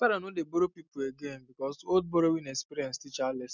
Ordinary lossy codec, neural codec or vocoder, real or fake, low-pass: none; none; real; 7.2 kHz